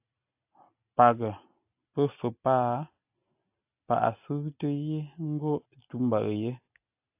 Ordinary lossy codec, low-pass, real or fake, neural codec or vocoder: AAC, 32 kbps; 3.6 kHz; real; none